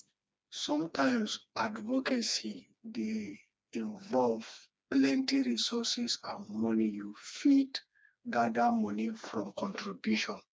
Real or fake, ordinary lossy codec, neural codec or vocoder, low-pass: fake; none; codec, 16 kHz, 2 kbps, FreqCodec, smaller model; none